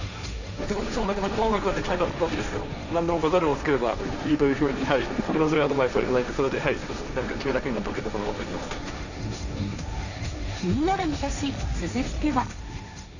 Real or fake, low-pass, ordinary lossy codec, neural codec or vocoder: fake; 7.2 kHz; none; codec, 16 kHz, 1.1 kbps, Voila-Tokenizer